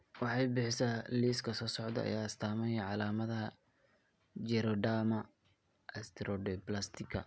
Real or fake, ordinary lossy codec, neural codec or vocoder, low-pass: real; none; none; none